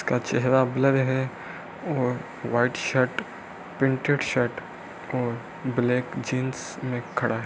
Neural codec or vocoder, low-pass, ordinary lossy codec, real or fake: none; none; none; real